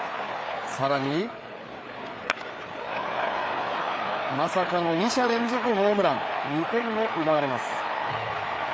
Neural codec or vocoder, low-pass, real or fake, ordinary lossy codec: codec, 16 kHz, 4 kbps, FreqCodec, larger model; none; fake; none